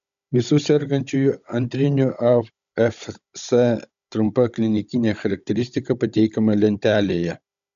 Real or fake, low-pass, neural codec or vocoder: fake; 7.2 kHz; codec, 16 kHz, 16 kbps, FunCodec, trained on Chinese and English, 50 frames a second